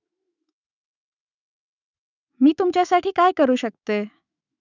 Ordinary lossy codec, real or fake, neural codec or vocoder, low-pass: none; fake; autoencoder, 48 kHz, 128 numbers a frame, DAC-VAE, trained on Japanese speech; 7.2 kHz